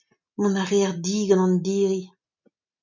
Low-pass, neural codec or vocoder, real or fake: 7.2 kHz; none; real